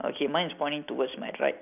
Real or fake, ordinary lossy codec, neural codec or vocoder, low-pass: real; none; none; 3.6 kHz